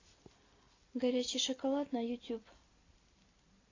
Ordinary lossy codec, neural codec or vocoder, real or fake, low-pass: AAC, 32 kbps; none; real; 7.2 kHz